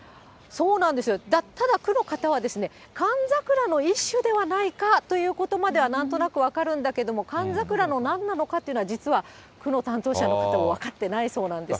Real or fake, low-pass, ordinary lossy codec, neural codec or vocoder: real; none; none; none